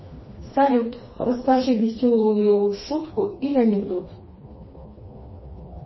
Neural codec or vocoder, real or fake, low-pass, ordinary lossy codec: codec, 16 kHz, 2 kbps, FreqCodec, smaller model; fake; 7.2 kHz; MP3, 24 kbps